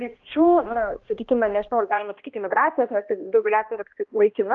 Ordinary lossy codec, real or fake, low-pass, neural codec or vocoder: Opus, 32 kbps; fake; 7.2 kHz; codec, 16 kHz, 1 kbps, X-Codec, HuBERT features, trained on balanced general audio